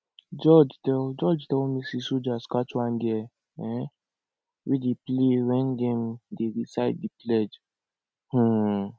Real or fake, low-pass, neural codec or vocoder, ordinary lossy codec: real; none; none; none